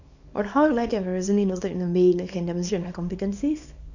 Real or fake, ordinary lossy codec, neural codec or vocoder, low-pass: fake; none; codec, 24 kHz, 0.9 kbps, WavTokenizer, small release; 7.2 kHz